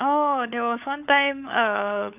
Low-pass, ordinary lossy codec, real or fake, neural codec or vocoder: 3.6 kHz; none; fake; codec, 16 kHz, 16 kbps, FunCodec, trained on LibriTTS, 50 frames a second